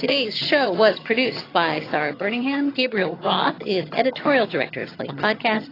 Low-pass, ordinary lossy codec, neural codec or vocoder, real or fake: 5.4 kHz; AAC, 24 kbps; vocoder, 22.05 kHz, 80 mel bands, HiFi-GAN; fake